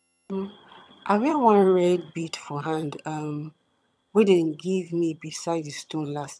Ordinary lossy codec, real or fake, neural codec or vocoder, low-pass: none; fake; vocoder, 22.05 kHz, 80 mel bands, HiFi-GAN; none